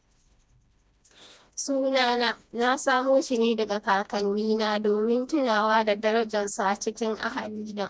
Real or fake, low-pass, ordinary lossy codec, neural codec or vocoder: fake; none; none; codec, 16 kHz, 1 kbps, FreqCodec, smaller model